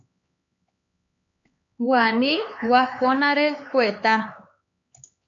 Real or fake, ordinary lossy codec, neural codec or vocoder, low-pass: fake; AAC, 48 kbps; codec, 16 kHz, 4 kbps, X-Codec, HuBERT features, trained on LibriSpeech; 7.2 kHz